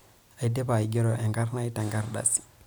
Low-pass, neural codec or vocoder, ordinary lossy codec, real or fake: none; none; none; real